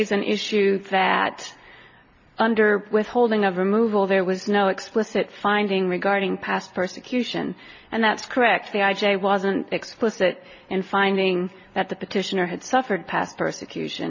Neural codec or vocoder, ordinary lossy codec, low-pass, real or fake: none; MP3, 48 kbps; 7.2 kHz; real